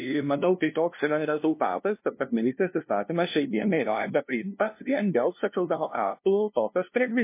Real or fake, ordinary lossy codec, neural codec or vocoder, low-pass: fake; MP3, 24 kbps; codec, 16 kHz, 0.5 kbps, FunCodec, trained on LibriTTS, 25 frames a second; 3.6 kHz